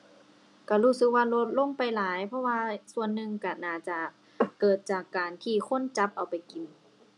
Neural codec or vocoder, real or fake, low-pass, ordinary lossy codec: none; real; 10.8 kHz; none